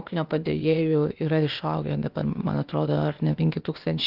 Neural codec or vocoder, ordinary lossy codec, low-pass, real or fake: codec, 16 kHz, 0.8 kbps, ZipCodec; Opus, 24 kbps; 5.4 kHz; fake